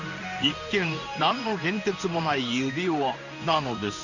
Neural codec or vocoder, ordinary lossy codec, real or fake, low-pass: codec, 16 kHz in and 24 kHz out, 1 kbps, XY-Tokenizer; none; fake; 7.2 kHz